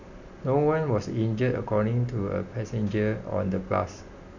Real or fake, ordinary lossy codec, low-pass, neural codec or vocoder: real; none; 7.2 kHz; none